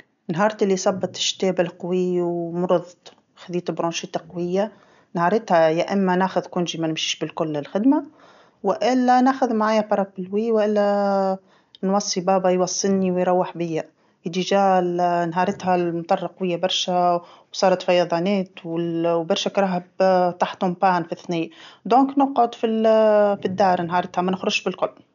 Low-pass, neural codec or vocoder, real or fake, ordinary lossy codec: 7.2 kHz; none; real; none